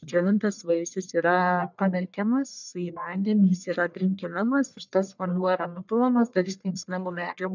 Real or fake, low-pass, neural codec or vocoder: fake; 7.2 kHz; codec, 44.1 kHz, 1.7 kbps, Pupu-Codec